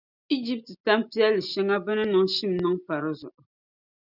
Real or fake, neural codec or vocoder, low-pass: real; none; 5.4 kHz